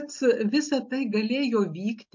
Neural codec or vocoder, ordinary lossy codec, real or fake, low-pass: none; MP3, 48 kbps; real; 7.2 kHz